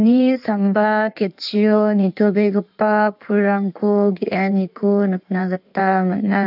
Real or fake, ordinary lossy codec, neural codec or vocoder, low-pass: fake; none; codec, 16 kHz in and 24 kHz out, 1.1 kbps, FireRedTTS-2 codec; 5.4 kHz